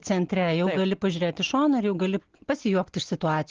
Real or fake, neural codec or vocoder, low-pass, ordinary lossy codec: real; none; 7.2 kHz; Opus, 16 kbps